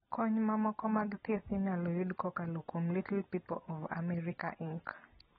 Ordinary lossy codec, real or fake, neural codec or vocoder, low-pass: AAC, 16 kbps; real; none; 19.8 kHz